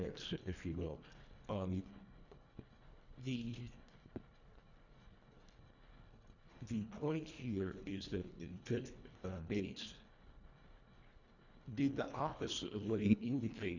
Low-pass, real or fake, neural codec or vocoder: 7.2 kHz; fake; codec, 24 kHz, 1.5 kbps, HILCodec